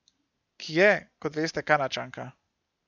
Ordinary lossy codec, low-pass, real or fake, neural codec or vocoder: none; 7.2 kHz; real; none